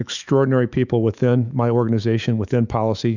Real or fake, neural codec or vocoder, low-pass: fake; autoencoder, 48 kHz, 128 numbers a frame, DAC-VAE, trained on Japanese speech; 7.2 kHz